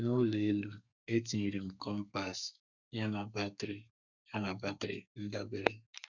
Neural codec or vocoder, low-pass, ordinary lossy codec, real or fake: codec, 32 kHz, 1.9 kbps, SNAC; 7.2 kHz; none; fake